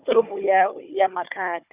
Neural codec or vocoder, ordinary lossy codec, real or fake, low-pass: codec, 16 kHz, 4 kbps, FunCodec, trained on Chinese and English, 50 frames a second; Opus, 64 kbps; fake; 3.6 kHz